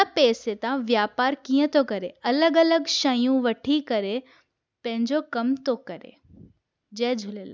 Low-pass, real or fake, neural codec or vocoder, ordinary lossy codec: none; real; none; none